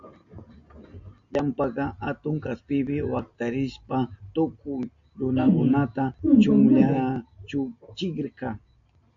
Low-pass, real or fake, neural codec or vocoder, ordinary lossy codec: 7.2 kHz; real; none; AAC, 64 kbps